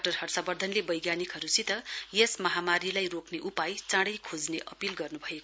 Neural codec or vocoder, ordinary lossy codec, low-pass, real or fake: none; none; none; real